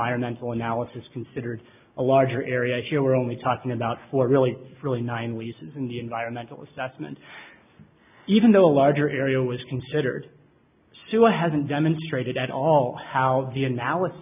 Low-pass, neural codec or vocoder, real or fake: 3.6 kHz; none; real